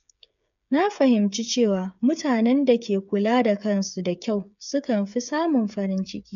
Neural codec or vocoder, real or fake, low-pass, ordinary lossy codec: codec, 16 kHz, 8 kbps, FreqCodec, smaller model; fake; 7.2 kHz; none